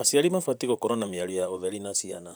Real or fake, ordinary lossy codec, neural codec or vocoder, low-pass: fake; none; vocoder, 44.1 kHz, 128 mel bands, Pupu-Vocoder; none